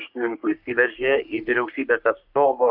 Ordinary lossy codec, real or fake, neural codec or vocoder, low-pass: AAC, 48 kbps; fake; codec, 44.1 kHz, 3.4 kbps, Pupu-Codec; 5.4 kHz